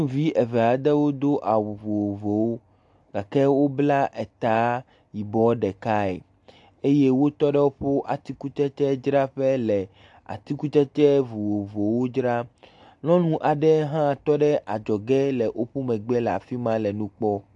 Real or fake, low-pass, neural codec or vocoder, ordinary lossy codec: real; 10.8 kHz; none; AAC, 64 kbps